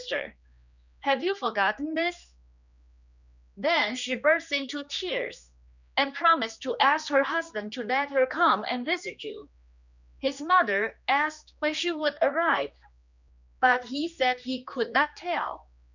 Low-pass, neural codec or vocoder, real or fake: 7.2 kHz; codec, 16 kHz, 2 kbps, X-Codec, HuBERT features, trained on general audio; fake